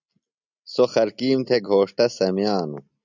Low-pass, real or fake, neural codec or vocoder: 7.2 kHz; real; none